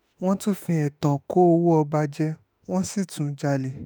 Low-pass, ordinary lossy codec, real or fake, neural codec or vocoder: none; none; fake; autoencoder, 48 kHz, 32 numbers a frame, DAC-VAE, trained on Japanese speech